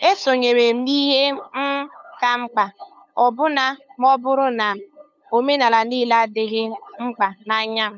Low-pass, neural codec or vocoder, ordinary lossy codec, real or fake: 7.2 kHz; codec, 16 kHz, 8 kbps, FunCodec, trained on LibriTTS, 25 frames a second; none; fake